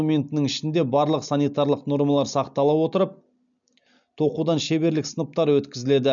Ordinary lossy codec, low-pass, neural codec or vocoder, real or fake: none; 7.2 kHz; none; real